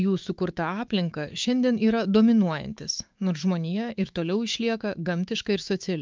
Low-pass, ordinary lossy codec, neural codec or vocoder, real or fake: 7.2 kHz; Opus, 24 kbps; autoencoder, 48 kHz, 128 numbers a frame, DAC-VAE, trained on Japanese speech; fake